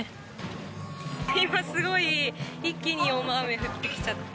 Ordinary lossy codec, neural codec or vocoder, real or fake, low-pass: none; none; real; none